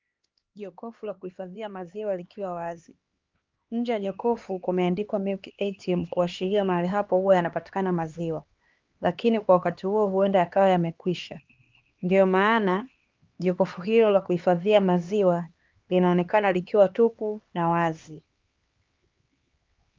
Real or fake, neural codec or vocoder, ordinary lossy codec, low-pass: fake; codec, 16 kHz, 2 kbps, X-Codec, HuBERT features, trained on LibriSpeech; Opus, 32 kbps; 7.2 kHz